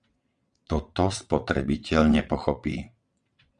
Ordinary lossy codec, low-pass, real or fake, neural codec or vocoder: Opus, 64 kbps; 9.9 kHz; fake; vocoder, 22.05 kHz, 80 mel bands, WaveNeXt